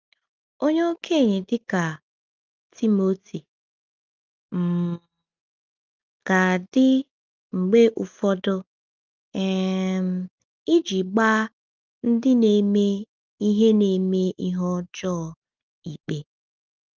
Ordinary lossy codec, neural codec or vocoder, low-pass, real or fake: Opus, 32 kbps; none; 7.2 kHz; real